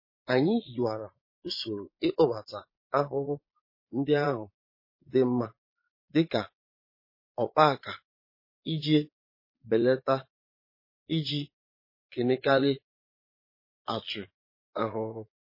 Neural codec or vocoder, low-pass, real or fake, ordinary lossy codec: vocoder, 22.05 kHz, 80 mel bands, Vocos; 5.4 kHz; fake; MP3, 24 kbps